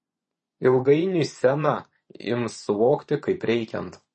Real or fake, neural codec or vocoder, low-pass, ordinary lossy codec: fake; vocoder, 24 kHz, 100 mel bands, Vocos; 10.8 kHz; MP3, 32 kbps